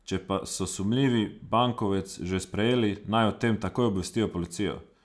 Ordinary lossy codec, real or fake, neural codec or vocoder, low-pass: none; real; none; none